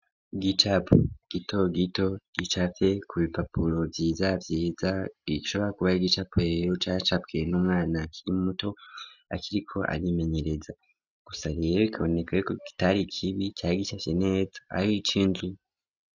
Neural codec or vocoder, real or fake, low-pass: none; real; 7.2 kHz